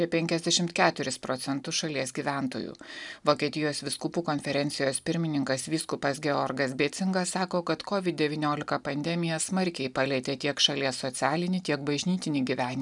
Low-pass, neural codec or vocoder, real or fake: 10.8 kHz; none; real